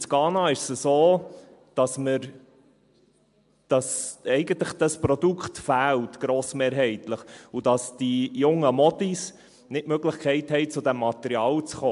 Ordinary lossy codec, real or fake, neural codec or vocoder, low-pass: MP3, 64 kbps; real; none; 10.8 kHz